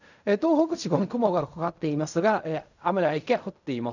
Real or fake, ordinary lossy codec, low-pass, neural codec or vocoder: fake; none; 7.2 kHz; codec, 16 kHz in and 24 kHz out, 0.4 kbps, LongCat-Audio-Codec, fine tuned four codebook decoder